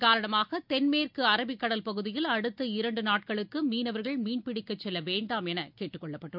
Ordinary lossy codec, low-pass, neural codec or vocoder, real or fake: none; 5.4 kHz; none; real